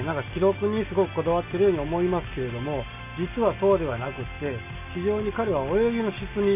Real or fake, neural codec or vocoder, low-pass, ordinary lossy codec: real; none; 3.6 kHz; none